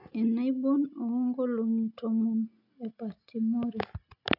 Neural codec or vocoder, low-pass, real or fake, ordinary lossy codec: none; 5.4 kHz; real; none